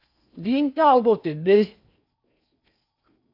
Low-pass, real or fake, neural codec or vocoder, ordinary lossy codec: 5.4 kHz; fake; codec, 16 kHz in and 24 kHz out, 0.6 kbps, FocalCodec, streaming, 4096 codes; AAC, 48 kbps